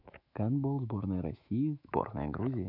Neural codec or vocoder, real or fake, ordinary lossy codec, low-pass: autoencoder, 48 kHz, 128 numbers a frame, DAC-VAE, trained on Japanese speech; fake; none; 5.4 kHz